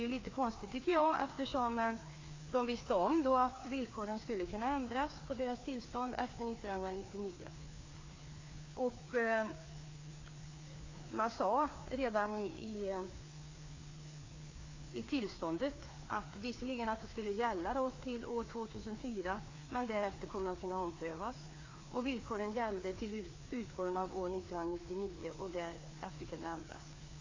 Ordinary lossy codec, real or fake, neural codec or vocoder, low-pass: AAC, 32 kbps; fake; codec, 16 kHz, 2 kbps, FreqCodec, larger model; 7.2 kHz